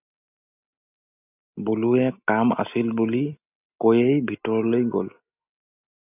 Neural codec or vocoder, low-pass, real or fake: none; 3.6 kHz; real